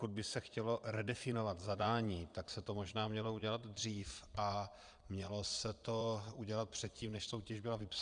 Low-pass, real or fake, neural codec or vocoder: 9.9 kHz; fake; vocoder, 22.05 kHz, 80 mel bands, Vocos